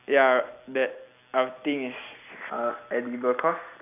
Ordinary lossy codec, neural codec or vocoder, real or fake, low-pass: none; none; real; 3.6 kHz